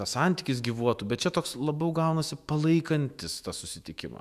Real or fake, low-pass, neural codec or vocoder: fake; 14.4 kHz; autoencoder, 48 kHz, 128 numbers a frame, DAC-VAE, trained on Japanese speech